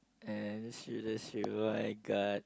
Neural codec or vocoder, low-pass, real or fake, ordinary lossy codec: none; none; real; none